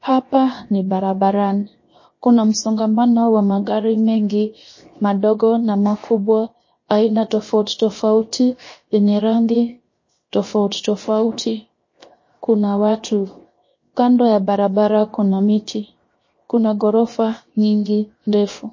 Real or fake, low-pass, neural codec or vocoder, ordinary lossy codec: fake; 7.2 kHz; codec, 16 kHz, 0.7 kbps, FocalCodec; MP3, 32 kbps